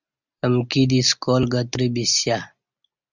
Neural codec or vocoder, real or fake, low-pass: none; real; 7.2 kHz